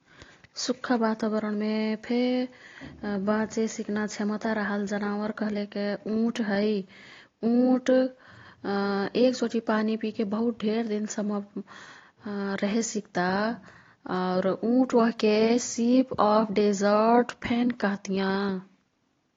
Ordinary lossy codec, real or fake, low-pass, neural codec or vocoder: AAC, 32 kbps; real; 7.2 kHz; none